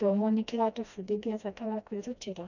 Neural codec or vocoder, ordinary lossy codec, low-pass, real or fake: codec, 16 kHz, 1 kbps, FreqCodec, smaller model; none; 7.2 kHz; fake